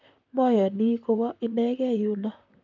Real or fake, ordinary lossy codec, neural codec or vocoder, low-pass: fake; none; vocoder, 44.1 kHz, 128 mel bands, Pupu-Vocoder; 7.2 kHz